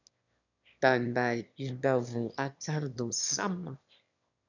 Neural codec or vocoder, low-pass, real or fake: autoencoder, 22.05 kHz, a latent of 192 numbers a frame, VITS, trained on one speaker; 7.2 kHz; fake